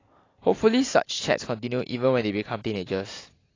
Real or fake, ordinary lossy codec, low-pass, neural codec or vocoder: real; AAC, 32 kbps; 7.2 kHz; none